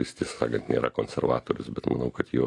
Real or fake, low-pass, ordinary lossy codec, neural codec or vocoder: real; 10.8 kHz; AAC, 48 kbps; none